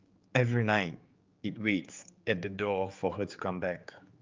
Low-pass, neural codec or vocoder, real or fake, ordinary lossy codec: 7.2 kHz; codec, 16 kHz, 4 kbps, X-Codec, HuBERT features, trained on general audio; fake; Opus, 32 kbps